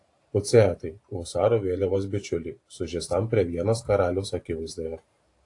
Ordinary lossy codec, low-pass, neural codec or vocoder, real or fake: AAC, 48 kbps; 10.8 kHz; vocoder, 44.1 kHz, 128 mel bands every 512 samples, BigVGAN v2; fake